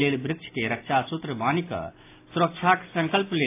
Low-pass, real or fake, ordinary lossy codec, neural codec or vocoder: 3.6 kHz; real; AAC, 24 kbps; none